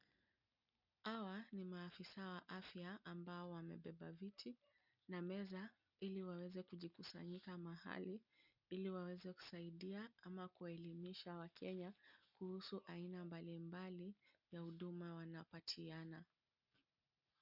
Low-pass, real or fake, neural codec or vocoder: 5.4 kHz; real; none